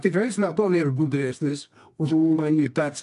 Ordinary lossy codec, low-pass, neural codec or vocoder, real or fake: AAC, 96 kbps; 10.8 kHz; codec, 24 kHz, 0.9 kbps, WavTokenizer, medium music audio release; fake